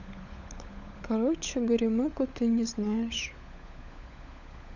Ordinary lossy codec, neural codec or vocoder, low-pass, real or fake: none; codec, 16 kHz, 16 kbps, FunCodec, trained on LibriTTS, 50 frames a second; 7.2 kHz; fake